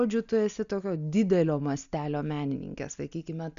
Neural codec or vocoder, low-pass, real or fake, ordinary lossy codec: none; 7.2 kHz; real; AAC, 96 kbps